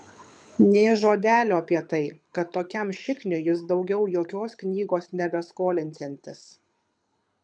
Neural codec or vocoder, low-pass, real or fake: codec, 24 kHz, 6 kbps, HILCodec; 9.9 kHz; fake